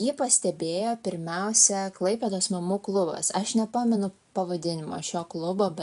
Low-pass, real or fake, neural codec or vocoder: 10.8 kHz; real; none